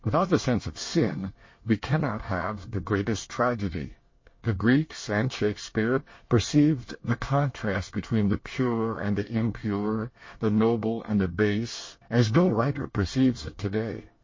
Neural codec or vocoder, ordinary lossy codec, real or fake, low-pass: codec, 24 kHz, 1 kbps, SNAC; MP3, 32 kbps; fake; 7.2 kHz